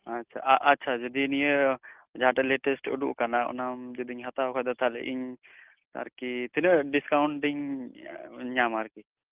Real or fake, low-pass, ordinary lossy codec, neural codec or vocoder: real; 3.6 kHz; Opus, 32 kbps; none